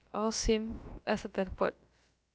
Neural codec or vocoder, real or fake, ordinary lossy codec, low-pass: codec, 16 kHz, about 1 kbps, DyCAST, with the encoder's durations; fake; none; none